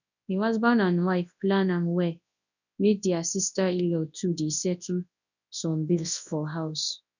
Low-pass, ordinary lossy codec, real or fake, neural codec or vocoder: 7.2 kHz; none; fake; codec, 24 kHz, 0.9 kbps, WavTokenizer, large speech release